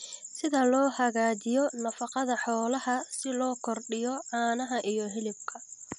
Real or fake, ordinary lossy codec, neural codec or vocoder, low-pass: real; none; none; 10.8 kHz